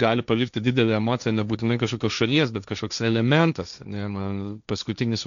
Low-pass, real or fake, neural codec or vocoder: 7.2 kHz; fake; codec, 16 kHz, 1.1 kbps, Voila-Tokenizer